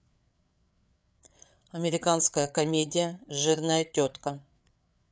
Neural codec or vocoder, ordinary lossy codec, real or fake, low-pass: codec, 16 kHz, 8 kbps, FreqCodec, larger model; none; fake; none